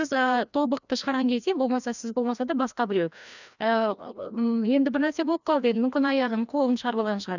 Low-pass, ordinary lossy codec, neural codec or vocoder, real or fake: 7.2 kHz; none; codec, 16 kHz, 1 kbps, FreqCodec, larger model; fake